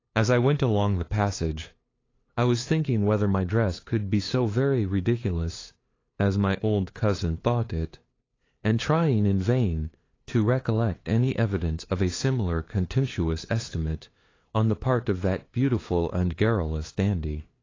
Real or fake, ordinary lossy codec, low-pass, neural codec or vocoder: fake; AAC, 32 kbps; 7.2 kHz; codec, 16 kHz, 2 kbps, FunCodec, trained on LibriTTS, 25 frames a second